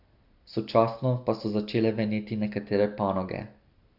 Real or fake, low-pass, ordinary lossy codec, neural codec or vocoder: real; 5.4 kHz; Opus, 64 kbps; none